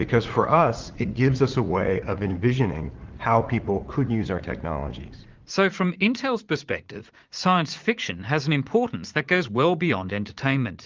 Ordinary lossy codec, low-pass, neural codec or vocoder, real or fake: Opus, 32 kbps; 7.2 kHz; vocoder, 44.1 kHz, 80 mel bands, Vocos; fake